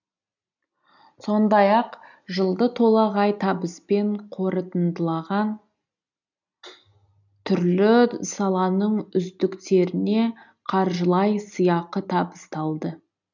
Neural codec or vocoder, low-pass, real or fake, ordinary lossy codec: none; 7.2 kHz; real; none